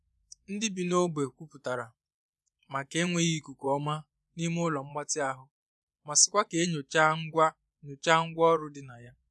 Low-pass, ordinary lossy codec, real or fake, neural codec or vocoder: none; none; fake; vocoder, 24 kHz, 100 mel bands, Vocos